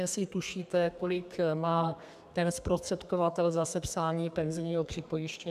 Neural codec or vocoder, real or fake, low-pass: codec, 32 kHz, 1.9 kbps, SNAC; fake; 14.4 kHz